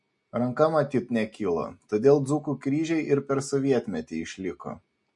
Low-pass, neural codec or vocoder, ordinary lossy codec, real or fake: 10.8 kHz; none; MP3, 48 kbps; real